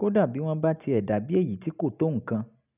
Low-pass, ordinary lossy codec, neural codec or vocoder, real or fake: 3.6 kHz; none; none; real